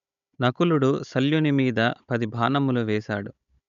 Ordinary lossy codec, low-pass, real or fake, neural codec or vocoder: none; 7.2 kHz; fake; codec, 16 kHz, 16 kbps, FunCodec, trained on Chinese and English, 50 frames a second